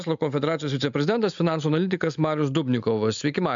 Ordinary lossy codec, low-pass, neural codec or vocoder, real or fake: MP3, 64 kbps; 7.2 kHz; none; real